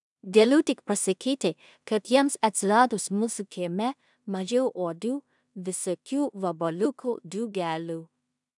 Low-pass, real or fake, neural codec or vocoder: 10.8 kHz; fake; codec, 16 kHz in and 24 kHz out, 0.4 kbps, LongCat-Audio-Codec, two codebook decoder